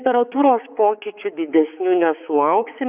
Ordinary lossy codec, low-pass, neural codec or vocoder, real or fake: Opus, 64 kbps; 3.6 kHz; codec, 16 kHz, 4 kbps, X-Codec, HuBERT features, trained on balanced general audio; fake